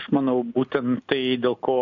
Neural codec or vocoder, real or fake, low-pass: none; real; 7.2 kHz